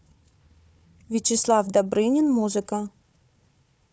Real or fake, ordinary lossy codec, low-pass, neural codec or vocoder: fake; none; none; codec, 16 kHz, 4 kbps, FunCodec, trained on Chinese and English, 50 frames a second